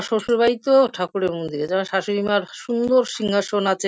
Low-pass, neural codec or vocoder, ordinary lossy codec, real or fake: none; none; none; real